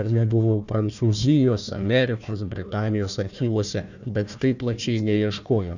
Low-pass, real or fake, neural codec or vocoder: 7.2 kHz; fake; codec, 16 kHz, 1 kbps, FunCodec, trained on Chinese and English, 50 frames a second